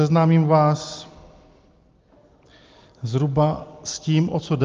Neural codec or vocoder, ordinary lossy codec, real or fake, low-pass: none; Opus, 32 kbps; real; 7.2 kHz